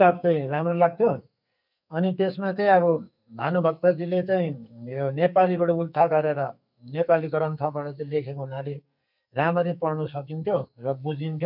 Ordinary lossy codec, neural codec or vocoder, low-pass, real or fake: none; codec, 44.1 kHz, 2.6 kbps, SNAC; 5.4 kHz; fake